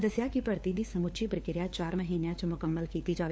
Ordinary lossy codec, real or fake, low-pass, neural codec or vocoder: none; fake; none; codec, 16 kHz, 4 kbps, FunCodec, trained on LibriTTS, 50 frames a second